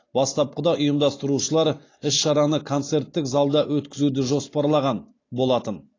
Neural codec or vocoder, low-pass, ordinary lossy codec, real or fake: none; 7.2 kHz; AAC, 32 kbps; real